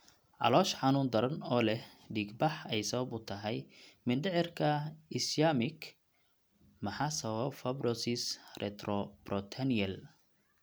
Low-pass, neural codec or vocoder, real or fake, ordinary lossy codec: none; none; real; none